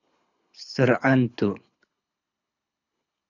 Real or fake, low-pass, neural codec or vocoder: fake; 7.2 kHz; codec, 24 kHz, 6 kbps, HILCodec